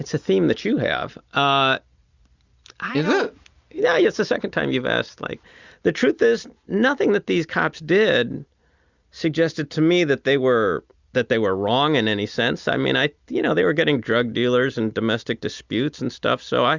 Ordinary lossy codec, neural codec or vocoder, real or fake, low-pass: Opus, 64 kbps; none; real; 7.2 kHz